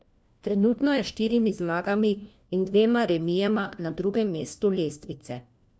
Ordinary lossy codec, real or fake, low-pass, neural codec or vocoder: none; fake; none; codec, 16 kHz, 1 kbps, FunCodec, trained on LibriTTS, 50 frames a second